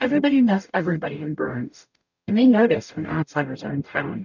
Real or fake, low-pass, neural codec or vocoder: fake; 7.2 kHz; codec, 44.1 kHz, 0.9 kbps, DAC